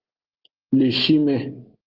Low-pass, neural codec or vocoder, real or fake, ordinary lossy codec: 5.4 kHz; none; real; Opus, 16 kbps